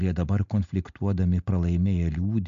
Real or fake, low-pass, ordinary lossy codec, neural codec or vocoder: real; 7.2 kHz; AAC, 64 kbps; none